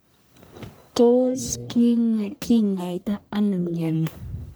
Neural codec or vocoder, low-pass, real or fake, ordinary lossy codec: codec, 44.1 kHz, 1.7 kbps, Pupu-Codec; none; fake; none